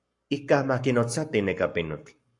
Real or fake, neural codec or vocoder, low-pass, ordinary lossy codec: fake; codec, 44.1 kHz, 7.8 kbps, Pupu-Codec; 10.8 kHz; MP3, 64 kbps